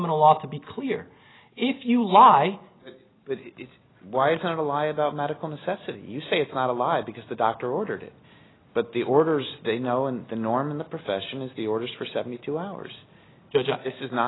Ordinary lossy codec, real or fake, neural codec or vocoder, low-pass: AAC, 16 kbps; real; none; 7.2 kHz